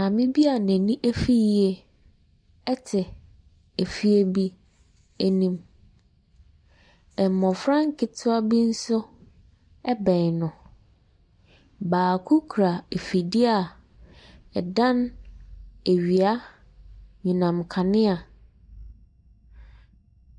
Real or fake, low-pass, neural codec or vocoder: real; 9.9 kHz; none